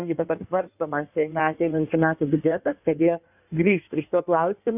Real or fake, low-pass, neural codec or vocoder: fake; 3.6 kHz; codec, 16 kHz in and 24 kHz out, 1.1 kbps, FireRedTTS-2 codec